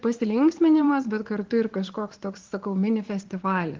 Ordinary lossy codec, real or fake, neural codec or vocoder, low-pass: Opus, 16 kbps; fake; vocoder, 44.1 kHz, 80 mel bands, Vocos; 7.2 kHz